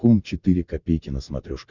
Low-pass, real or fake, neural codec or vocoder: 7.2 kHz; real; none